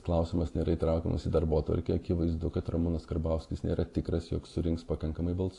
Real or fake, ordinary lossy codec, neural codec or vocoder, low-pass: real; AAC, 48 kbps; none; 10.8 kHz